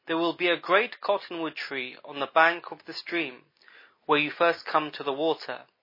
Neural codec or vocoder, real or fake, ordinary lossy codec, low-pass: none; real; MP3, 24 kbps; 5.4 kHz